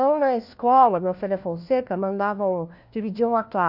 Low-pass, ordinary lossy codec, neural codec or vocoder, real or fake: 5.4 kHz; none; codec, 16 kHz, 1 kbps, FunCodec, trained on LibriTTS, 50 frames a second; fake